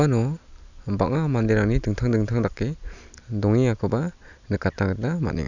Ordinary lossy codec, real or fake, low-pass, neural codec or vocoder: none; real; 7.2 kHz; none